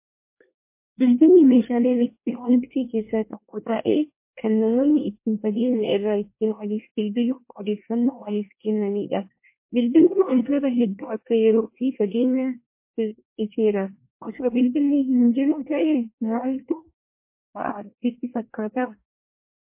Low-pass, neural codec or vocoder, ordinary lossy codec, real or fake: 3.6 kHz; codec, 24 kHz, 1 kbps, SNAC; MP3, 24 kbps; fake